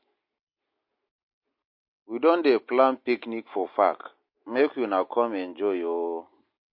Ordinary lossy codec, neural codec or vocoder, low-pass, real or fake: MP3, 32 kbps; none; 5.4 kHz; real